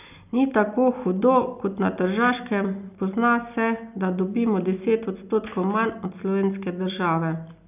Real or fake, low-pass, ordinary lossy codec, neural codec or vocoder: real; 3.6 kHz; none; none